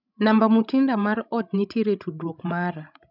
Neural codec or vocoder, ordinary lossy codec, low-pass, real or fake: codec, 16 kHz, 16 kbps, FreqCodec, larger model; none; 5.4 kHz; fake